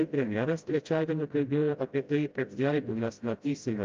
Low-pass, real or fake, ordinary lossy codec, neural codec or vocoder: 7.2 kHz; fake; Opus, 24 kbps; codec, 16 kHz, 0.5 kbps, FreqCodec, smaller model